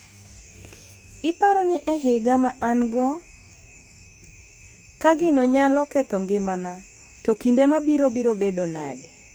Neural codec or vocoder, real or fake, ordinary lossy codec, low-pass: codec, 44.1 kHz, 2.6 kbps, DAC; fake; none; none